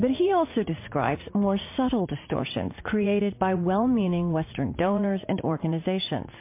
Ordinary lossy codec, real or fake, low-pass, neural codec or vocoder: MP3, 24 kbps; fake; 3.6 kHz; vocoder, 44.1 kHz, 80 mel bands, Vocos